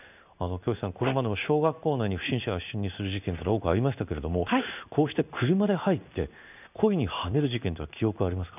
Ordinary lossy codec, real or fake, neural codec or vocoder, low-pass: none; fake; codec, 16 kHz in and 24 kHz out, 1 kbps, XY-Tokenizer; 3.6 kHz